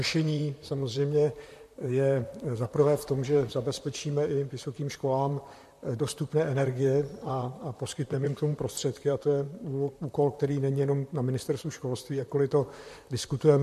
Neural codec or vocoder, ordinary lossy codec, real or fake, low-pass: vocoder, 44.1 kHz, 128 mel bands, Pupu-Vocoder; MP3, 64 kbps; fake; 14.4 kHz